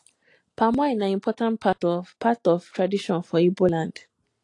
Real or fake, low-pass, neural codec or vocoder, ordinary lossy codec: real; 10.8 kHz; none; AAC, 48 kbps